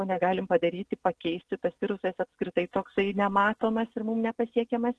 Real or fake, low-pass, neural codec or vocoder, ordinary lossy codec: real; 10.8 kHz; none; Opus, 16 kbps